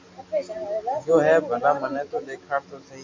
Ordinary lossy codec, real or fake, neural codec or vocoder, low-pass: MP3, 48 kbps; real; none; 7.2 kHz